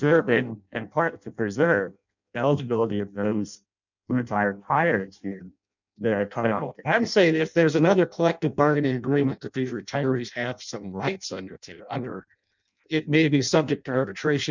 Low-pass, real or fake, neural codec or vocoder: 7.2 kHz; fake; codec, 16 kHz in and 24 kHz out, 0.6 kbps, FireRedTTS-2 codec